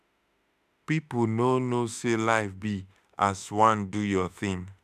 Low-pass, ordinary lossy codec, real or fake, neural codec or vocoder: 14.4 kHz; none; fake; autoencoder, 48 kHz, 32 numbers a frame, DAC-VAE, trained on Japanese speech